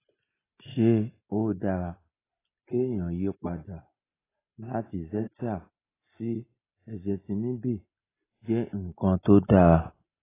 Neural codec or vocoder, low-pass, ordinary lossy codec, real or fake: none; 3.6 kHz; AAC, 16 kbps; real